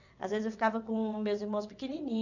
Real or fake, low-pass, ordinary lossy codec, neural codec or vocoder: fake; 7.2 kHz; none; vocoder, 22.05 kHz, 80 mel bands, WaveNeXt